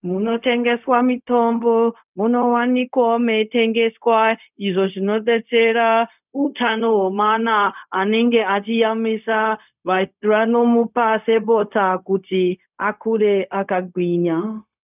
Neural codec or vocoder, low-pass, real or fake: codec, 16 kHz, 0.4 kbps, LongCat-Audio-Codec; 3.6 kHz; fake